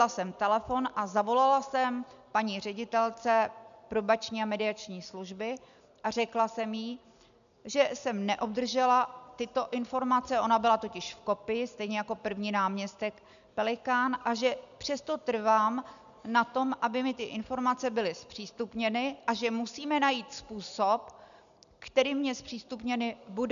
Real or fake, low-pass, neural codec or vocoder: real; 7.2 kHz; none